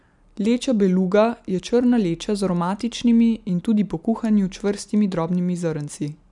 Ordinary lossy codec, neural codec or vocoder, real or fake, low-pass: none; none; real; 10.8 kHz